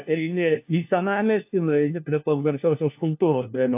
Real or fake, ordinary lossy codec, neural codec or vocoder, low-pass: fake; AAC, 32 kbps; codec, 16 kHz, 1 kbps, FunCodec, trained on LibriTTS, 50 frames a second; 3.6 kHz